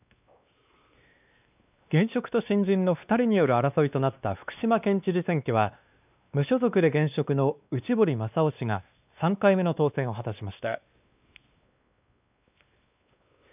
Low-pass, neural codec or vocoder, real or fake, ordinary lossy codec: 3.6 kHz; codec, 16 kHz, 2 kbps, X-Codec, WavLM features, trained on Multilingual LibriSpeech; fake; none